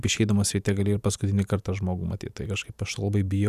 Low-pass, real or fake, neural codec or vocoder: 14.4 kHz; fake; vocoder, 44.1 kHz, 128 mel bands every 512 samples, BigVGAN v2